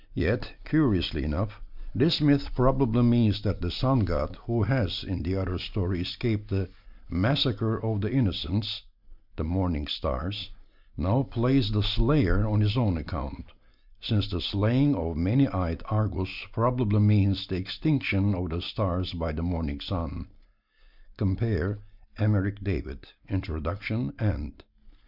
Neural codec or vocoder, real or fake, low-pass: none; real; 5.4 kHz